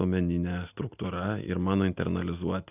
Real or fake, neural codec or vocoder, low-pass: real; none; 3.6 kHz